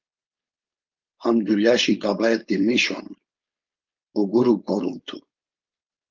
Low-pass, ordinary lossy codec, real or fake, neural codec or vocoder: 7.2 kHz; Opus, 32 kbps; fake; codec, 16 kHz, 4.8 kbps, FACodec